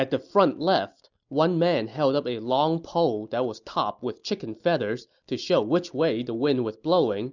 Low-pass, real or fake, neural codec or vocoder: 7.2 kHz; real; none